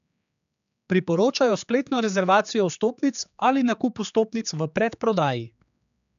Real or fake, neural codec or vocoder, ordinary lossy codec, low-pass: fake; codec, 16 kHz, 4 kbps, X-Codec, HuBERT features, trained on general audio; none; 7.2 kHz